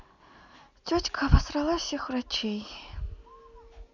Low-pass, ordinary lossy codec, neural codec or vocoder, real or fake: 7.2 kHz; none; none; real